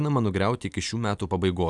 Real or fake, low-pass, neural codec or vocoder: real; 10.8 kHz; none